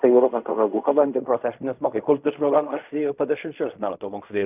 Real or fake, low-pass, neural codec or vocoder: fake; 3.6 kHz; codec, 16 kHz in and 24 kHz out, 0.4 kbps, LongCat-Audio-Codec, fine tuned four codebook decoder